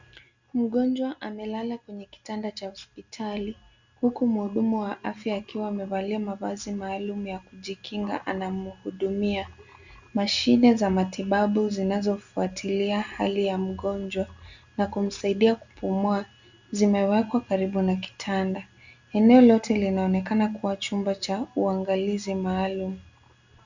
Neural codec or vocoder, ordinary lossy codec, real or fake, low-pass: none; Opus, 64 kbps; real; 7.2 kHz